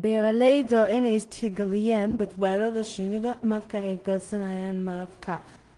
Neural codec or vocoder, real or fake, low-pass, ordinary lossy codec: codec, 16 kHz in and 24 kHz out, 0.4 kbps, LongCat-Audio-Codec, two codebook decoder; fake; 10.8 kHz; Opus, 24 kbps